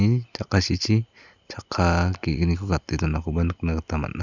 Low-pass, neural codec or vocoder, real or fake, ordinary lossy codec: 7.2 kHz; none; real; none